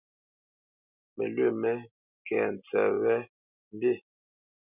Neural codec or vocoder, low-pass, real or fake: none; 3.6 kHz; real